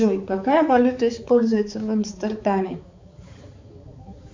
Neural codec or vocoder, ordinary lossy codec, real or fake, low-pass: codec, 16 kHz, 4 kbps, X-Codec, HuBERT features, trained on balanced general audio; MP3, 64 kbps; fake; 7.2 kHz